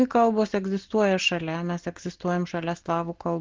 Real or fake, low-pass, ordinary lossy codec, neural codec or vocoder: real; 7.2 kHz; Opus, 16 kbps; none